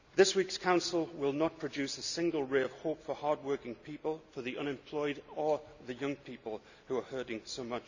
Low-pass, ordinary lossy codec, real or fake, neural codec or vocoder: 7.2 kHz; none; real; none